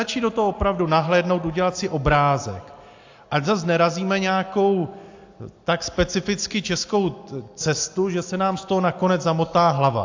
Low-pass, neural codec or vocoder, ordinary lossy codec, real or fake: 7.2 kHz; none; AAC, 48 kbps; real